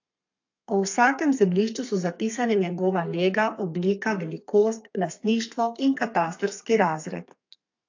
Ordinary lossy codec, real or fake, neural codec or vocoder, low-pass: AAC, 48 kbps; fake; codec, 32 kHz, 1.9 kbps, SNAC; 7.2 kHz